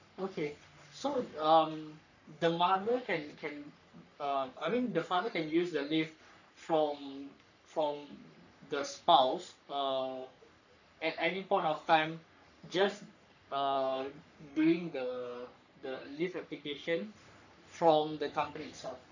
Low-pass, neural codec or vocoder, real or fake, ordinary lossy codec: 7.2 kHz; codec, 44.1 kHz, 3.4 kbps, Pupu-Codec; fake; none